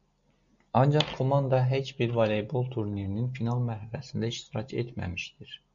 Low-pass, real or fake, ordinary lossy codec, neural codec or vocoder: 7.2 kHz; real; MP3, 48 kbps; none